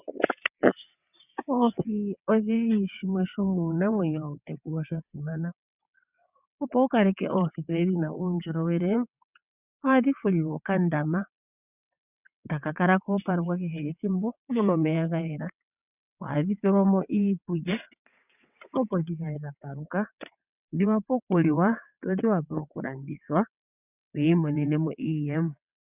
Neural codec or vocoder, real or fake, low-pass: vocoder, 22.05 kHz, 80 mel bands, WaveNeXt; fake; 3.6 kHz